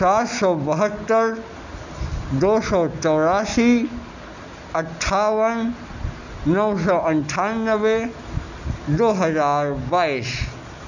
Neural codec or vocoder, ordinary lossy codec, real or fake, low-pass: none; none; real; 7.2 kHz